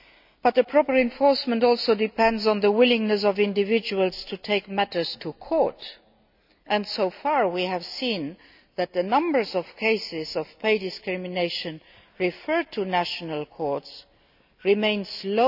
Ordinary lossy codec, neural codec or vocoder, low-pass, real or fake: none; none; 5.4 kHz; real